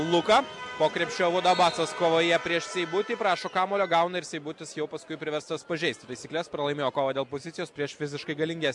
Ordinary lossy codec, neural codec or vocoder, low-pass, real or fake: MP3, 64 kbps; none; 10.8 kHz; real